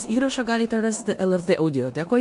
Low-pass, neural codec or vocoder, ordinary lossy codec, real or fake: 10.8 kHz; codec, 16 kHz in and 24 kHz out, 0.9 kbps, LongCat-Audio-Codec, four codebook decoder; AAC, 64 kbps; fake